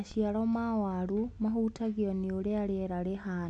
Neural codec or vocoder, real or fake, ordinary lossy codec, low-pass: none; real; AAC, 64 kbps; 10.8 kHz